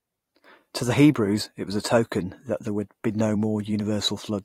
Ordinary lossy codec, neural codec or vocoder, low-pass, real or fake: AAC, 48 kbps; vocoder, 44.1 kHz, 128 mel bands every 512 samples, BigVGAN v2; 19.8 kHz; fake